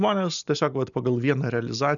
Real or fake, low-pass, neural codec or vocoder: real; 7.2 kHz; none